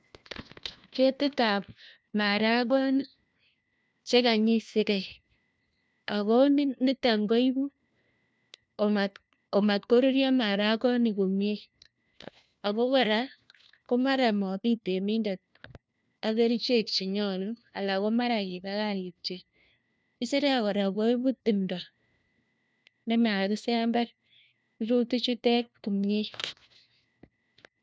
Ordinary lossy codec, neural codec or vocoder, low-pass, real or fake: none; codec, 16 kHz, 1 kbps, FunCodec, trained on LibriTTS, 50 frames a second; none; fake